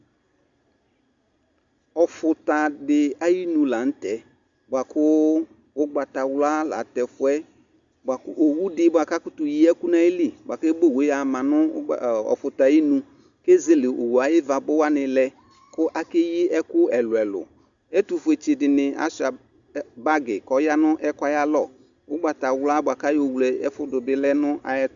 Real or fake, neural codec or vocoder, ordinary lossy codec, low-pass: real; none; Opus, 64 kbps; 7.2 kHz